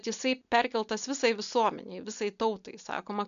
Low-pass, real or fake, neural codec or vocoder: 7.2 kHz; real; none